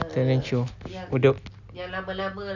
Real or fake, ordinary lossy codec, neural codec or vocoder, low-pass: real; none; none; 7.2 kHz